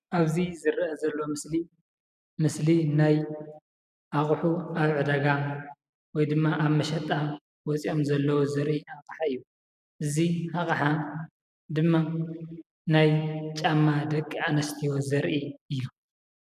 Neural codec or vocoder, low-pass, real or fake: none; 14.4 kHz; real